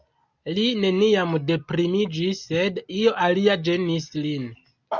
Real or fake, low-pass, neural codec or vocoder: real; 7.2 kHz; none